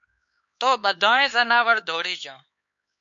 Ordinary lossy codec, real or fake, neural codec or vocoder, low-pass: MP3, 48 kbps; fake; codec, 16 kHz, 4 kbps, X-Codec, HuBERT features, trained on LibriSpeech; 7.2 kHz